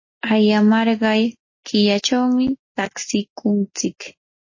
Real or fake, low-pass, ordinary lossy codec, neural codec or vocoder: real; 7.2 kHz; MP3, 32 kbps; none